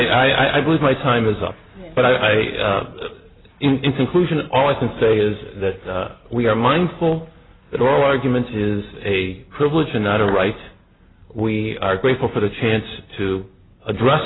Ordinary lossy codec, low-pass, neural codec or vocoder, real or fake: AAC, 16 kbps; 7.2 kHz; none; real